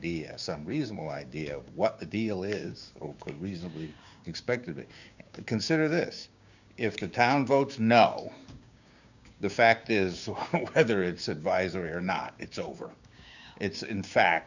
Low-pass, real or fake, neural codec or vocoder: 7.2 kHz; fake; codec, 16 kHz, 6 kbps, DAC